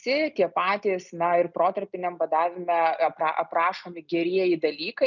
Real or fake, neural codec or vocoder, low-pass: real; none; 7.2 kHz